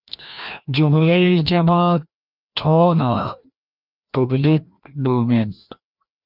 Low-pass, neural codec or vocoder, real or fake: 5.4 kHz; codec, 16 kHz, 1 kbps, FreqCodec, larger model; fake